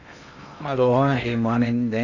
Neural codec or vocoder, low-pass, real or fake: codec, 16 kHz in and 24 kHz out, 0.6 kbps, FocalCodec, streaming, 2048 codes; 7.2 kHz; fake